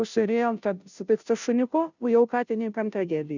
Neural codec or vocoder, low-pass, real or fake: codec, 16 kHz, 0.5 kbps, FunCodec, trained on Chinese and English, 25 frames a second; 7.2 kHz; fake